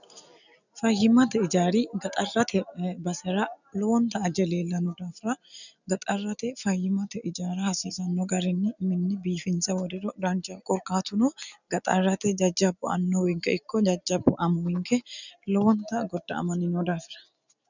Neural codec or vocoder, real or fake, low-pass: none; real; 7.2 kHz